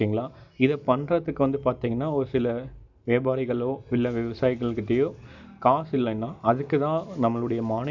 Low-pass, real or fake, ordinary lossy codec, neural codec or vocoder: 7.2 kHz; real; none; none